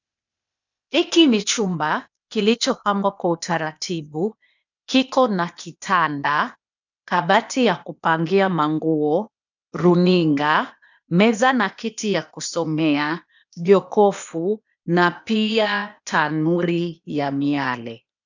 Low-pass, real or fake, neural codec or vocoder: 7.2 kHz; fake; codec, 16 kHz, 0.8 kbps, ZipCodec